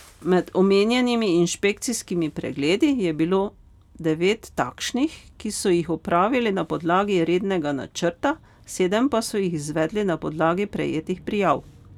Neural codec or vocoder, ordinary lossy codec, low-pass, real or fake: none; none; 19.8 kHz; real